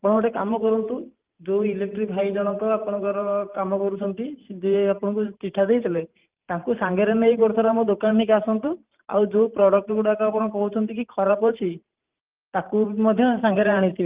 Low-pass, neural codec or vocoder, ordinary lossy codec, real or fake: 3.6 kHz; vocoder, 44.1 kHz, 128 mel bands every 512 samples, BigVGAN v2; Opus, 24 kbps; fake